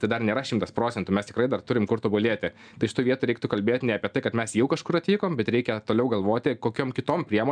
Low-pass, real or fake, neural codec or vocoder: 9.9 kHz; real; none